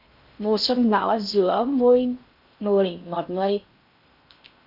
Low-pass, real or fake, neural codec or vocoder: 5.4 kHz; fake; codec, 16 kHz in and 24 kHz out, 0.6 kbps, FocalCodec, streaming, 4096 codes